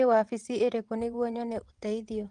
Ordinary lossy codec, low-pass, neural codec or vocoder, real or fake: Opus, 24 kbps; 9.9 kHz; vocoder, 22.05 kHz, 80 mel bands, WaveNeXt; fake